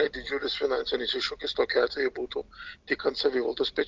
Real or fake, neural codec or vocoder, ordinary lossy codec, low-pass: real; none; Opus, 32 kbps; 7.2 kHz